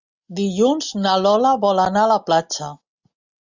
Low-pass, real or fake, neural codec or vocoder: 7.2 kHz; real; none